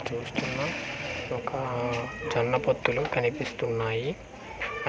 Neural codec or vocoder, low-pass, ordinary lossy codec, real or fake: none; none; none; real